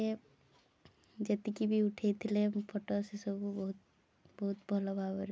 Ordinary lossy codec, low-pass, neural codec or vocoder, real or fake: none; none; none; real